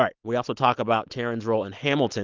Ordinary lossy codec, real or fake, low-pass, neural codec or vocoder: Opus, 32 kbps; real; 7.2 kHz; none